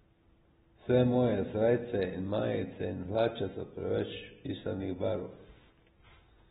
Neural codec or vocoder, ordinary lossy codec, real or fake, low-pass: none; AAC, 16 kbps; real; 19.8 kHz